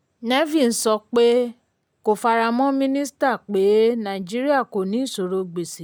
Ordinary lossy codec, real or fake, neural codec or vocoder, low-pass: none; real; none; none